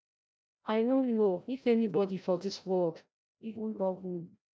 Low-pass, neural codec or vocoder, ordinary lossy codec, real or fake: none; codec, 16 kHz, 0.5 kbps, FreqCodec, larger model; none; fake